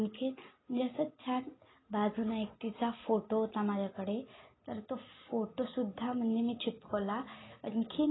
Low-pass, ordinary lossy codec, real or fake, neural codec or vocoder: 7.2 kHz; AAC, 16 kbps; real; none